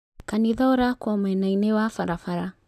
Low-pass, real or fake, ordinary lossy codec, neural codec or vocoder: 14.4 kHz; real; none; none